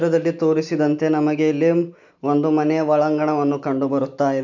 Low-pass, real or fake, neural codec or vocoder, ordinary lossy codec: 7.2 kHz; real; none; none